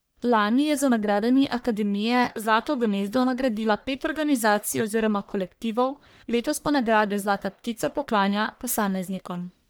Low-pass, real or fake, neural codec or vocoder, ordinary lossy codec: none; fake; codec, 44.1 kHz, 1.7 kbps, Pupu-Codec; none